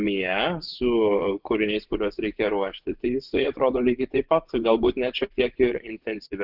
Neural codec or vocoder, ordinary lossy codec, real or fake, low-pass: vocoder, 24 kHz, 100 mel bands, Vocos; Opus, 32 kbps; fake; 5.4 kHz